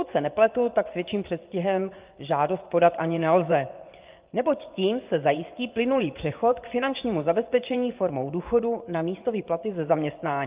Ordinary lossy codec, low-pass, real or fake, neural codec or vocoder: Opus, 24 kbps; 3.6 kHz; fake; vocoder, 24 kHz, 100 mel bands, Vocos